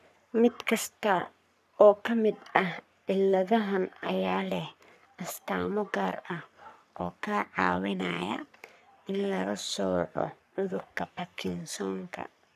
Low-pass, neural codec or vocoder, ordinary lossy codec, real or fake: 14.4 kHz; codec, 44.1 kHz, 3.4 kbps, Pupu-Codec; none; fake